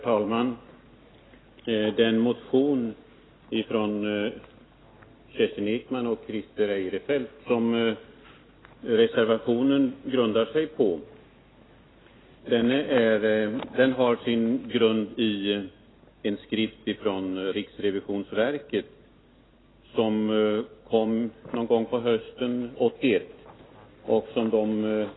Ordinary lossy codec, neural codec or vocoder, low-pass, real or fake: AAC, 16 kbps; none; 7.2 kHz; real